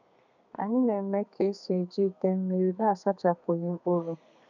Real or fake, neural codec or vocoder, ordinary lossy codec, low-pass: fake; codec, 32 kHz, 1.9 kbps, SNAC; none; 7.2 kHz